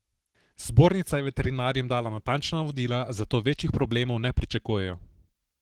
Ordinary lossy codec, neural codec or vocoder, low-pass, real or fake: Opus, 16 kbps; codec, 44.1 kHz, 7.8 kbps, Pupu-Codec; 19.8 kHz; fake